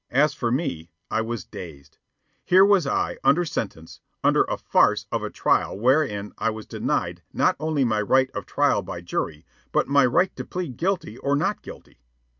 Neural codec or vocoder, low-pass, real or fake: none; 7.2 kHz; real